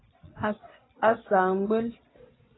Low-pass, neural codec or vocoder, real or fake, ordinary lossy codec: 7.2 kHz; vocoder, 22.05 kHz, 80 mel bands, Vocos; fake; AAC, 16 kbps